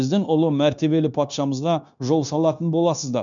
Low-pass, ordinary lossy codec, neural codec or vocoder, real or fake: 7.2 kHz; MP3, 96 kbps; codec, 16 kHz, 0.9 kbps, LongCat-Audio-Codec; fake